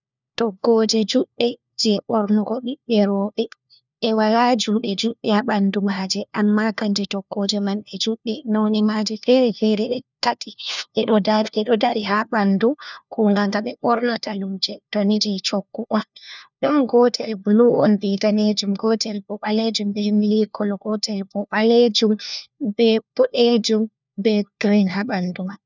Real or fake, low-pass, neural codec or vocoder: fake; 7.2 kHz; codec, 16 kHz, 1 kbps, FunCodec, trained on LibriTTS, 50 frames a second